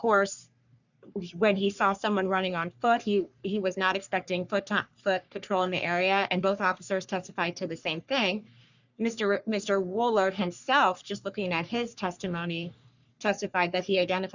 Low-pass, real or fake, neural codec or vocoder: 7.2 kHz; fake; codec, 44.1 kHz, 3.4 kbps, Pupu-Codec